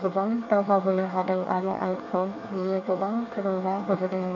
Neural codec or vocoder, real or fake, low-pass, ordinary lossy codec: codec, 24 kHz, 1 kbps, SNAC; fake; 7.2 kHz; none